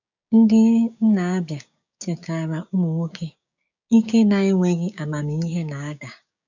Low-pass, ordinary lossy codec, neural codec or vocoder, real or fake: 7.2 kHz; none; codec, 16 kHz, 6 kbps, DAC; fake